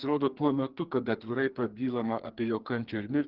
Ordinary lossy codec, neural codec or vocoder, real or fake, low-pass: Opus, 16 kbps; codec, 44.1 kHz, 2.6 kbps, SNAC; fake; 5.4 kHz